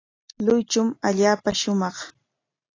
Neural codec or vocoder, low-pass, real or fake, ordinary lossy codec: none; 7.2 kHz; real; AAC, 32 kbps